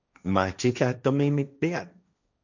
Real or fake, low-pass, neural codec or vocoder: fake; 7.2 kHz; codec, 16 kHz, 1.1 kbps, Voila-Tokenizer